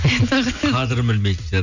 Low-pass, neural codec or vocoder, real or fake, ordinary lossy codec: 7.2 kHz; none; real; none